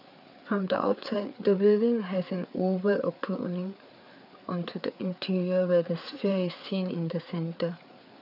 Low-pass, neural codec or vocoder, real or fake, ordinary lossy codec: 5.4 kHz; codec, 16 kHz, 4 kbps, FreqCodec, larger model; fake; none